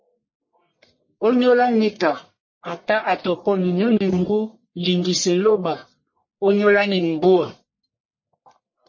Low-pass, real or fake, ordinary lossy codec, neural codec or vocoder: 7.2 kHz; fake; MP3, 32 kbps; codec, 44.1 kHz, 1.7 kbps, Pupu-Codec